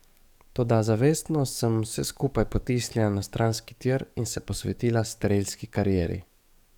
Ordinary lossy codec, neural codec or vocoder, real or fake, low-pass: none; codec, 44.1 kHz, 7.8 kbps, DAC; fake; 19.8 kHz